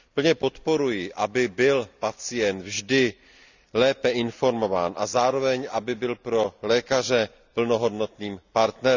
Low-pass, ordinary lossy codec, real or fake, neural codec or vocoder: 7.2 kHz; none; real; none